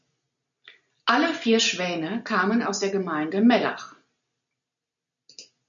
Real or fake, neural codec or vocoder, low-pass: real; none; 7.2 kHz